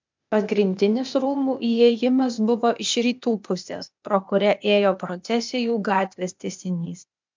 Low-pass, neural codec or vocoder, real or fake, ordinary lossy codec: 7.2 kHz; codec, 16 kHz, 0.8 kbps, ZipCodec; fake; MP3, 64 kbps